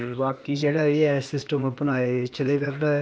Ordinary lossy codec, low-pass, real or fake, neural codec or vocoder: none; none; fake; codec, 16 kHz, 0.8 kbps, ZipCodec